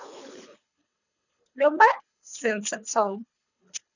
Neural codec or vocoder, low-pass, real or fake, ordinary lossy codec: codec, 24 kHz, 3 kbps, HILCodec; 7.2 kHz; fake; none